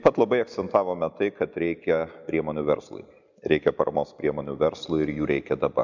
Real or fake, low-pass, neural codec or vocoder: real; 7.2 kHz; none